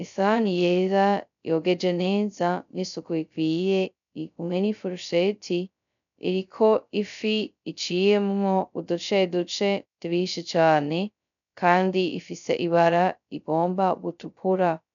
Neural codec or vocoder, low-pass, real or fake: codec, 16 kHz, 0.2 kbps, FocalCodec; 7.2 kHz; fake